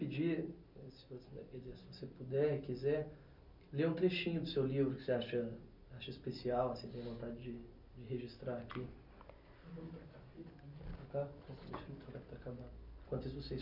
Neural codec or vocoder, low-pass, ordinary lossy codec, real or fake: none; 5.4 kHz; none; real